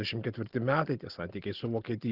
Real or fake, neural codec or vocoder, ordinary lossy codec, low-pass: real; none; Opus, 16 kbps; 5.4 kHz